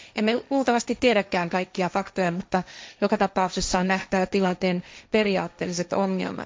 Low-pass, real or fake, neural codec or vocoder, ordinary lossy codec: none; fake; codec, 16 kHz, 1.1 kbps, Voila-Tokenizer; none